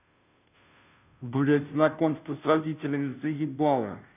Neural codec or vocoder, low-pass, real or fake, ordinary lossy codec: codec, 16 kHz, 0.5 kbps, FunCodec, trained on Chinese and English, 25 frames a second; 3.6 kHz; fake; none